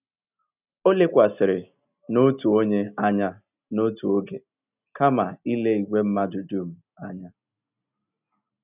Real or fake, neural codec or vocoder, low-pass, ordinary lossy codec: real; none; 3.6 kHz; none